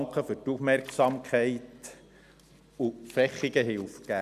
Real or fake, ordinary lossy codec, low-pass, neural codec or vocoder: real; none; 14.4 kHz; none